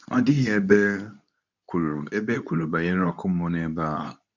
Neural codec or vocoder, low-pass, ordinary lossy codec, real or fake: codec, 24 kHz, 0.9 kbps, WavTokenizer, medium speech release version 2; 7.2 kHz; none; fake